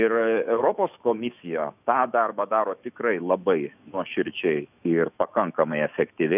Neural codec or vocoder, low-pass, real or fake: autoencoder, 48 kHz, 128 numbers a frame, DAC-VAE, trained on Japanese speech; 3.6 kHz; fake